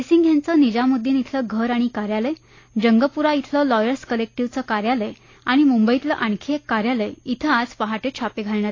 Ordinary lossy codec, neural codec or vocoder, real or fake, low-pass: AAC, 32 kbps; none; real; 7.2 kHz